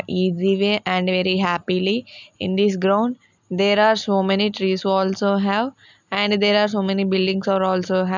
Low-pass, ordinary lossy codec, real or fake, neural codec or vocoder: 7.2 kHz; none; real; none